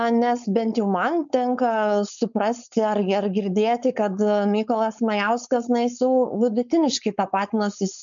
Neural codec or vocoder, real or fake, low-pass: codec, 16 kHz, 4.8 kbps, FACodec; fake; 7.2 kHz